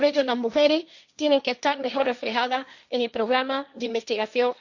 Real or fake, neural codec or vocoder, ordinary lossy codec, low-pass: fake; codec, 16 kHz, 1.1 kbps, Voila-Tokenizer; none; 7.2 kHz